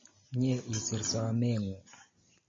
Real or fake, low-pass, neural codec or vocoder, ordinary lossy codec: real; 7.2 kHz; none; MP3, 32 kbps